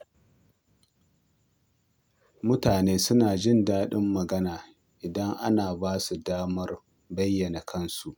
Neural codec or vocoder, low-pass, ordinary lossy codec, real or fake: none; none; none; real